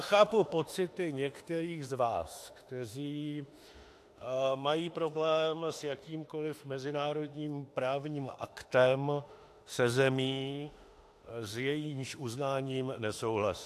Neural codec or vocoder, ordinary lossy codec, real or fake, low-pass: autoencoder, 48 kHz, 32 numbers a frame, DAC-VAE, trained on Japanese speech; AAC, 64 kbps; fake; 14.4 kHz